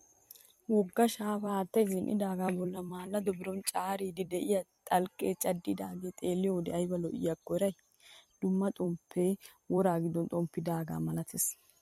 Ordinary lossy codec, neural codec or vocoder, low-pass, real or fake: MP3, 64 kbps; vocoder, 44.1 kHz, 128 mel bands every 256 samples, BigVGAN v2; 19.8 kHz; fake